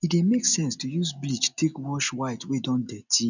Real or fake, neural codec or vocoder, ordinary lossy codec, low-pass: real; none; none; 7.2 kHz